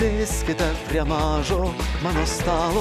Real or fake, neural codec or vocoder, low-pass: real; none; 14.4 kHz